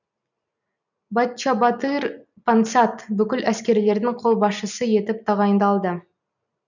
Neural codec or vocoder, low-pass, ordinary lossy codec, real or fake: none; 7.2 kHz; none; real